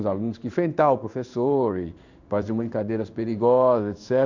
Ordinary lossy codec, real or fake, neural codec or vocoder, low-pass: none; fake; codec, 16 kHz in and 24 kHz out, 1 kbps, XY-Tokenizer; 7.2 kHz